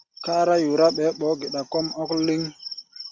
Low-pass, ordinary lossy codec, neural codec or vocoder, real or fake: 7.2 kHz; Opus, 64 kbps; none; real